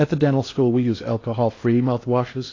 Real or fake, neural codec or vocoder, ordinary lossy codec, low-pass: fake; codec, 16 kHz in and 24 kHz out, 0.8 kbps, FocalCodec, streaming, 65536 codes; AAC, 32 kbps; 7.2 kHz